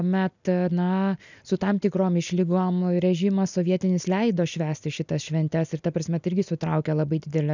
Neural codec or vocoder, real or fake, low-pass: none; real; 7.2 kHz